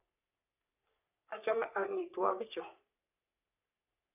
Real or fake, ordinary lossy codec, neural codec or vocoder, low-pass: fake; none; codec, 44.1 kHz, 3.4 kbps, Pupu-Codec; 3.6 kHz